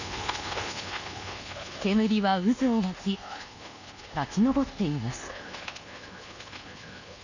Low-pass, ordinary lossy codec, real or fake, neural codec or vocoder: 7.2 kHz; none; fake; codec, 24 kHz, 1.2 kbps, DualCodec